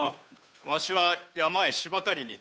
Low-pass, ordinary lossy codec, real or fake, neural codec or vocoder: none; none; fake; codec, 16 kHz, 2 kbps, FunCodec, trained on Chinese and English, 25 frames a second